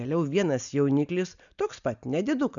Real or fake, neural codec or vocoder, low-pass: real; none; 7.2 kHz